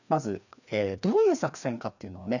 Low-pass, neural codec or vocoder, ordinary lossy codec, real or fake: 7.2 kHz; codec, 16 kHz, 2 kbps, FreqCodec, larger model; none; fake